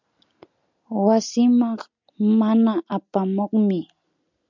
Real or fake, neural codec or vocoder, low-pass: real; none; 7.2 kHz